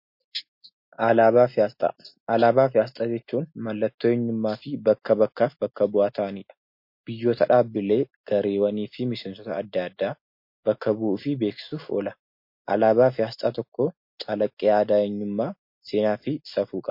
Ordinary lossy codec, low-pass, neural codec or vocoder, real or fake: MP3, 32 kbps; 5.4 kHz; none; real